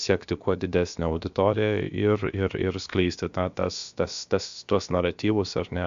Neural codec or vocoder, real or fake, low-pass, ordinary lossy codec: codec, 16 kHz, about 1 kbps, DyCAST, with the encoder's durations; fake; 7.2 kHz; MP3, 64 kbps